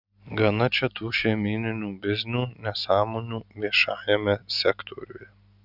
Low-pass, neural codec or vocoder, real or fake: 5.4 kHz; none; real